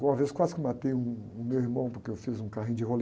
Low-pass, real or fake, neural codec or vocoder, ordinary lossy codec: none; real; none; none